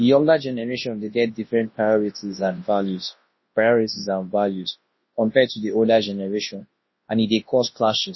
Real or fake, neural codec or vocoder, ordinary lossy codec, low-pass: fake; codec, 24 kHz, 0.9 kbps, WavTokenizer, large speech release; MP3, 24 kbps; 7.2 kHz